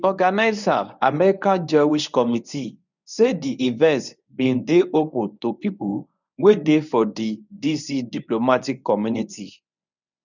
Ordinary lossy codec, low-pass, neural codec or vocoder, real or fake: none; 7.2 kHz; codec, 24 kHz, 0.9 kbps, WavTokenizer, medium speech release version 1; fake